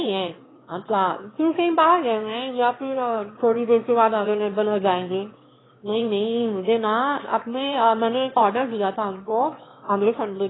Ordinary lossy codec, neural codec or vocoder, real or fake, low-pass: AAC, 16 kbps; autoencoder, 22.05 kHz, a latent of 192 numbers a frame, VITS, trained on one speaker; fake; 7.2 kHz